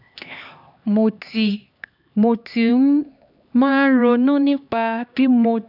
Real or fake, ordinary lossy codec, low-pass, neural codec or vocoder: fake; none; 5.4 kHz; codec, 16 kHz, 2 kbps, X-Codec, HuBERT features, trained on LibriSpeech